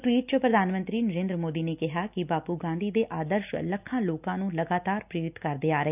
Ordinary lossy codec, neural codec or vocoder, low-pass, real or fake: none; none; 3.6 kHz; real